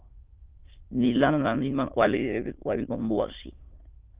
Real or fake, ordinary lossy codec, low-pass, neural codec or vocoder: fake; Opus, 32 kbps; 3.6 kHz; autoencoder, 22.05 kHz, a latent of 192 numbers a frame, VITS, trained on many speakers